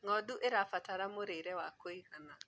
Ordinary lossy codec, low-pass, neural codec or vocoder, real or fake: none; none; none; real